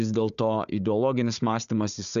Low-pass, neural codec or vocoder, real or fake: 7.2 kHz; codec, 16 kHz, 4 kbps, FunCodec, trained on Chinese and English, 50 frames a second; fake